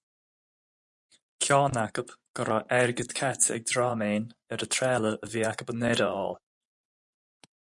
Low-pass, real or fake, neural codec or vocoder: 10.8 kHz; fake; vocoder, 24 kHz, 100 mel bands, Vocos